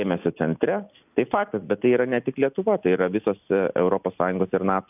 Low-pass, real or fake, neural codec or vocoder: 3.6 kHz; real; none